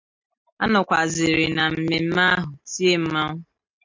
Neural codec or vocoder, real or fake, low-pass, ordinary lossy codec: none; real; 7.2 kHz; MP3, 64 kbps